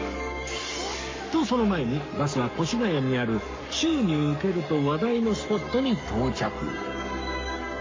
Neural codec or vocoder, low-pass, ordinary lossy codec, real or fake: codec, 44.1 kHz, 7.8 kbps, Pupu-Codec; 7.2 kHz; MP3, 32 kbps; fake